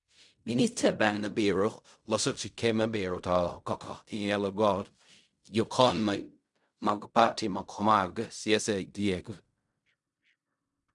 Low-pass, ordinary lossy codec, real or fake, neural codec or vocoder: 10.8 kHz; none; fake; codec, 16 kHz in and 24 kHz out, 0.4 kbps, LongCat-Audio-Codec, fine tuned four codebook decoder